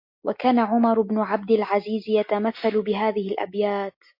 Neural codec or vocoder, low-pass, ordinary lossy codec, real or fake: none; 5.4 kHz; MP3, 32 kbps; real